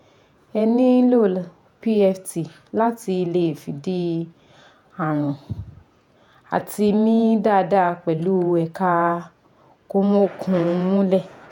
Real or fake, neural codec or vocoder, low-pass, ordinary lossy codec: fake; vocoder, 48 kHz, 128 mel bands, Vocos; 19.8 kHz; none